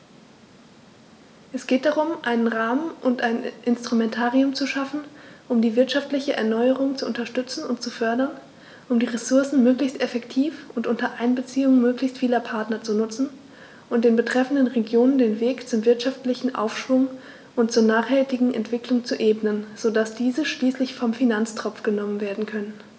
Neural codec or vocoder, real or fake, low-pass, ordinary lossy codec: none; real; none; none